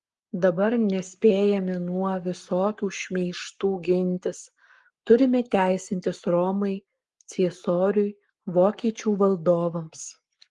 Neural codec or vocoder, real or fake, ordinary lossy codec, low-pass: codec, 44.1 kHz, 7.8 kbps, Pupu-Codec; fake; Opus, 24 kbps; 10.8 kHz